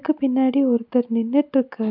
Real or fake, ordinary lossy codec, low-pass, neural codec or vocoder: real; MP3, 48 kbps; 5.4 kHz; none